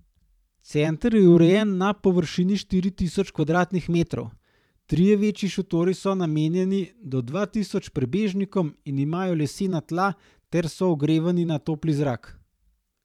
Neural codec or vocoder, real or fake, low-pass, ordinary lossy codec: vocoder, 44.1 kHz, 128 mel bands every 512 samples, BigVGAN v2; fake; 19.8 kHz; none